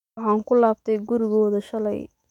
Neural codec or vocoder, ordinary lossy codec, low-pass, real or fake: autoencoder, 48 kHz, 128 numbers a frame, DAC-VAE, trained on Japanese speech; none; 19.8 kHz; fake